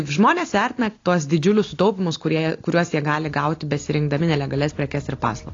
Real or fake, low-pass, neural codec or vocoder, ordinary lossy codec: real; 7.2 kHz; none; AAC, 32 kbps